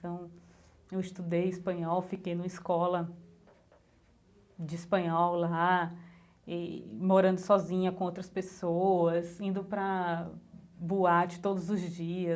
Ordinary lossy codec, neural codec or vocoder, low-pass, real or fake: none; none; none; real